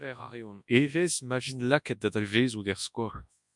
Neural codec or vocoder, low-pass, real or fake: codec, 24 kHz, 0.9 kbps, WavTokenizer, large speech release; 10.8 kHz; fake